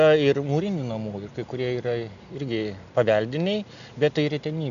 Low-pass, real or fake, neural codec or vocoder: 7.2 kHz; real; none